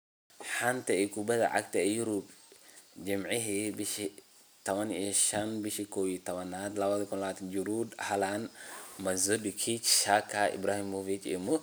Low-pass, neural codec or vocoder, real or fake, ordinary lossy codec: none; none; real; none